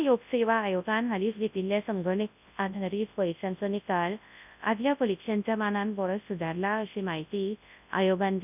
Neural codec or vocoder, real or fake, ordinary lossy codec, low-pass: codec, 24 kHz, 0.9 kbps, WavTokenizer, large speech release; fake; none; 3.6 kHz